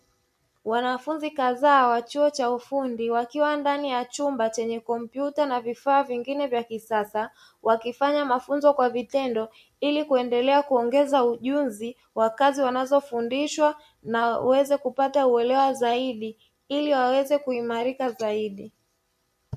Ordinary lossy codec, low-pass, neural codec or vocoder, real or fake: MP3, 64 kbps; 14.4 kHz; none; real